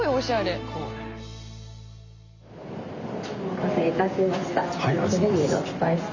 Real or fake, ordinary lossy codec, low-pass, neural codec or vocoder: real; Opus, 64 kbps; 7.2 kHz; none